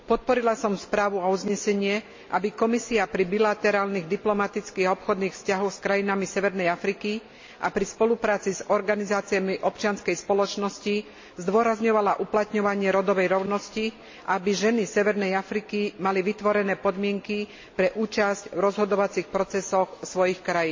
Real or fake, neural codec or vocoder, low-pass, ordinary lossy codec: real; none; 7.2 kHz; MP3, 32 kbps